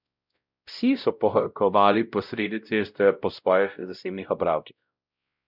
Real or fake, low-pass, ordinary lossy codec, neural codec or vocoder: fake; 5.4 kHz; none; codec, 16 kHz, 0.5 kbps, X-Codec, WavLM features, trained on Multilingual LibriSpeech